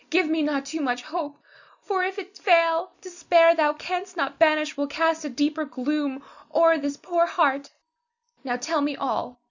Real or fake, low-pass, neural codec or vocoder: real; 7.2 kHz; none